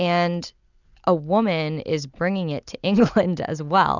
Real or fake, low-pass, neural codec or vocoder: real; 7.2 kHz; none